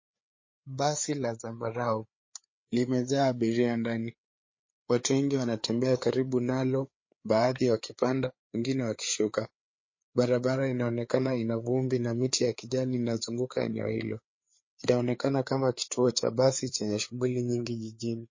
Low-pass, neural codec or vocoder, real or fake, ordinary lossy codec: 7.2 kHz; codec, 16 kHz, 4 kbps, FreqCodec, larger model; fake; MP3, 32 kbps